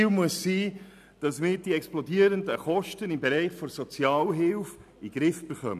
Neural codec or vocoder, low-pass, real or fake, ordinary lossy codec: none; 14.4 kHz; real; none